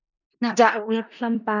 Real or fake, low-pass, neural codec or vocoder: fake; 7.2 kHz; codec, 16 kHz in and 24 kHz out, 0.4 kbps, LongCat-Audio-Codec, four codebook decoder